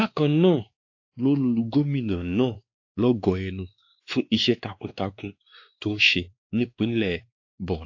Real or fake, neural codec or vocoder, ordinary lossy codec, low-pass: fake; codec, 16 kHz, 2 kbps, X-Codec, WavLM features, trained on Multilingual LibriSpeech; AAC, 48 kbps; 7.2 kHz